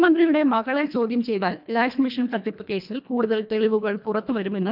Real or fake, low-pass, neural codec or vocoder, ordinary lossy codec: fake; 5.4 kHz; codec, 24 kHz, 1.5 kbps, HILCodec; none